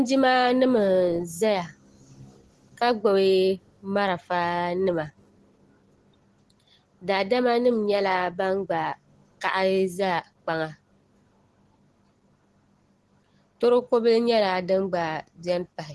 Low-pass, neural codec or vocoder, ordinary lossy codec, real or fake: 10.8 kHz; none; Opus, 16 kbps; real